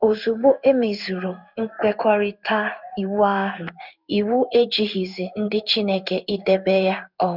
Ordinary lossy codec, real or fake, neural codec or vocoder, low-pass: none; fake; codec, 16 kHz in and 24 kHz out, 1 kbps, XY-Tokenizer; 5.4 kHz